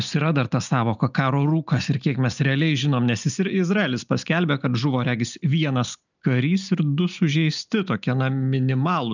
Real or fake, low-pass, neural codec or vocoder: real; 7.2 kHz; none